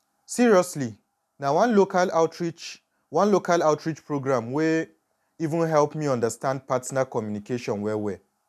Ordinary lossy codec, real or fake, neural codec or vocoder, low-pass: none; real; none; 14.4 kHz